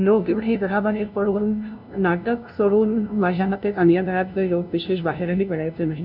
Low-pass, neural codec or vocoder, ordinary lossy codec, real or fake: 5.4 kHz; codec, 16 kHz, 0.5 kbps, FunCodec, trained on LibriTTS, 25 frames a second; none; fake